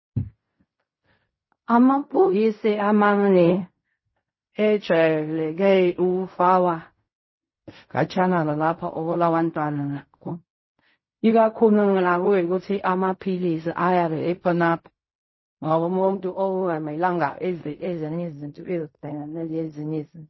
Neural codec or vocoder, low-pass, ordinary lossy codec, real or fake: codec, 16 kHz in and 24 kHz out, 0.4 kbps, LongCat-Audio-Codec, fine tuned four codebook decoder; 7.2 kHz; MP3, 24 kbps; fake